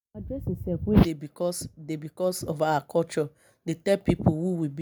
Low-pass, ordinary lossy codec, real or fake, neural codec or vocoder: none; none; real; none